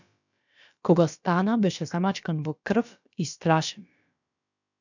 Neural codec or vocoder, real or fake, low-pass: codec, 16 kHz, about 1 kbps, DyCAST, with the encoder's durations; fake; 7.2 kHz